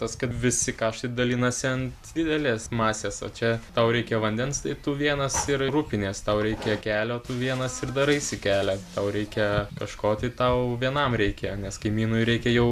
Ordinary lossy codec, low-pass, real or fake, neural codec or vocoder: AAC, 96 kbps; 14.4 kHz; real; none